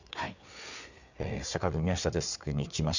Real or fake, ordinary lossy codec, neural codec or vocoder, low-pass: fake; none; codec, 16 kHz, 4 kbps, FreqCodec, larger model; 7.2 kHz